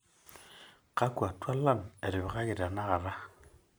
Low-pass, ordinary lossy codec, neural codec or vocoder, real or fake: none; none; none; real